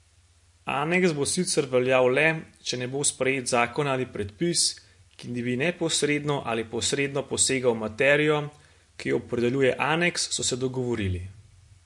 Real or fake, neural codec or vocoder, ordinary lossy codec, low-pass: real; none; MP3, 48 kbps; 10.8 kHz